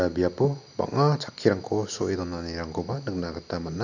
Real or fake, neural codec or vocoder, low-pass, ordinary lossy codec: real; none; 7.2 kHz; none